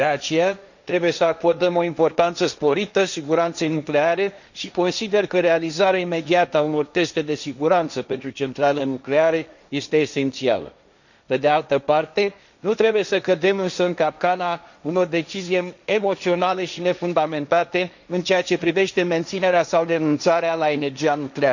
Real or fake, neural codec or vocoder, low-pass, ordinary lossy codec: fake; codec, 16 kHz, 1.1 kbps, Voila-Tokenizer; 7.2 kHz; none